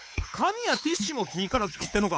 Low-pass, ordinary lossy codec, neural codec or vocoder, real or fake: none; none; codec, 16 kHz, 4 kbps, X-Codec, WavLM features, trained on Multilingual LibriSpeech; fake